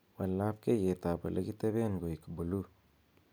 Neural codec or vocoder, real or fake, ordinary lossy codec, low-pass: none; real; none; none